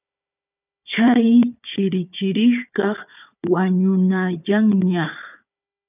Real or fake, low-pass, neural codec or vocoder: fake; 3.6 kHz; codec, 16 kHz, 4 kbps, FunCodec, trained on Chinese and English, 50 frames a second